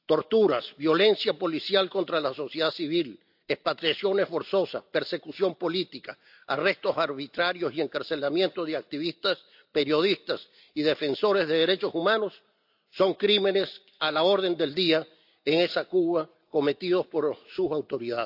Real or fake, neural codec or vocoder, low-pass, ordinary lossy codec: real; none; 5.4 kHz; AAC, 48 kbps